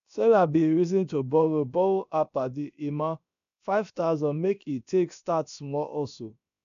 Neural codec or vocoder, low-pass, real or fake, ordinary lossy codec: codec, 16 kHz, about 1 kbps, DyCAST, with the encoder's durations; 7.2 kHz; fake; none